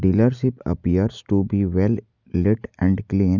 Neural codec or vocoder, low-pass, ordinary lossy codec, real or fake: none; 7.2 kHz; none; real